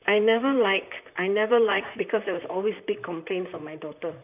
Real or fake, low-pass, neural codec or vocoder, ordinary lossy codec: fake; 3.6 kHz; vocoder, 44.1 kHz, 128 mel bands, Pupu-Vocoder; none